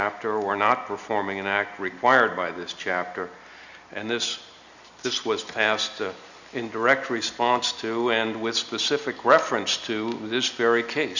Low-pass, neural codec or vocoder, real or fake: 7.2 kHz; none; real